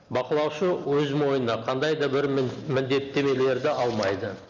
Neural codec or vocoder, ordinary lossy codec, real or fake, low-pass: none; none; real; 7.2 kHz